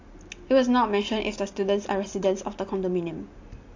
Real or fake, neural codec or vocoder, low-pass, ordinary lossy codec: real; none; 7.2 kHz; AAC, 48 kbps